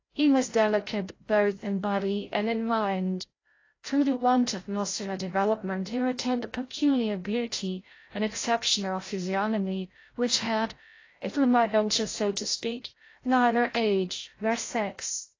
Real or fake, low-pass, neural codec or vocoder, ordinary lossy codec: fake; 7.2 kHz; codec, 16 kHz, 0.5 kbps, FreqCodec, larger model; AAC, 32 kbps